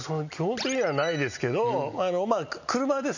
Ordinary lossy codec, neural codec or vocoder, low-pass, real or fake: none; none; 7.2 kHz; real